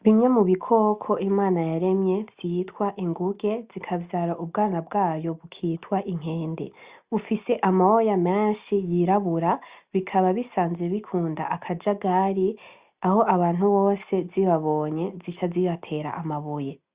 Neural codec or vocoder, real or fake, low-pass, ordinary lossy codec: none; real; 3.6 kHz; Opus, 64 kbps